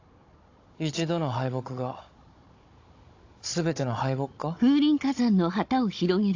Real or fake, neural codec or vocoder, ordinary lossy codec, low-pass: fake; codec, 16 kHz, 16 kbps, FunCodec, trained on Chinese and English, 50 frames a second; AAC, 48 kbps; 7.2 kHz